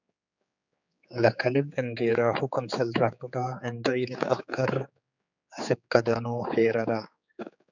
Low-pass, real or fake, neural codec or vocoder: 7.2 kHz; fake; codec, 16 kHz, 4 kbps, X-Codec, HuBERT features, trained on general audio